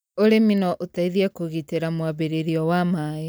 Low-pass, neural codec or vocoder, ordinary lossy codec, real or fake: none; none; none; real